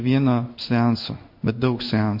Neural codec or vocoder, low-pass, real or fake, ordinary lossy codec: codec, 16 kHz in and 24 kHz out, 1 kbps, XY-Tokenizer; 5.4 kHz; fake; MP3, 32 kbps